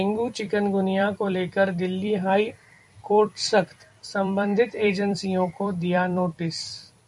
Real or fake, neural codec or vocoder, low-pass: fake; vocoder, 44.1 kHz, 128 mel bands every 256 samples, BigVGAN v2; 10.8 kHz